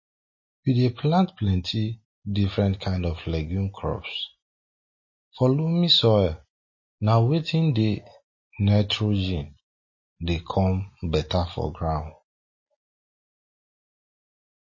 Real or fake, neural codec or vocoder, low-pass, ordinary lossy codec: real; none; 7.2 kHz; MP3, 32 kbps